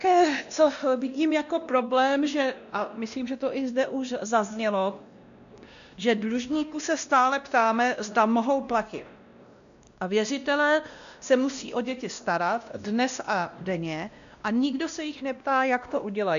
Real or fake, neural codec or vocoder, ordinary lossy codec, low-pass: fake; codec, 16 kHz, 1 kbps, X-Codec, WavLM features, trained on Multilingual LibriSpeech; MP3, 96 kbps; 7.2 kHz